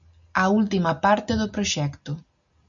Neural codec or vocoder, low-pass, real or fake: none; 7.2 kHz; real